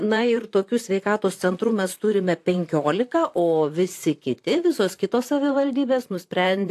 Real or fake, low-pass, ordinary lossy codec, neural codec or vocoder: fake; 14.4 kHz; AAC, 64 kbps; vocoder, 44.1 kHz, 128 mel bands, Pupu-Vocoder